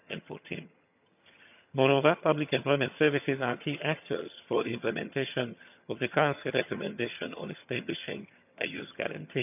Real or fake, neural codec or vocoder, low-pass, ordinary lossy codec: fake; vocoder, 22.05 kHz, 80 mel bands, HiFi-GAN; 3.6 kHz; none